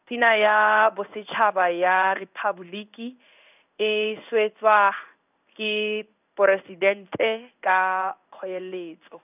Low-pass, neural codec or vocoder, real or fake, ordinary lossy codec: 3.6 kHz; codec, 16 kHz in and 24 kHz out, 1 kbps, XY-Tokenizer; fake; none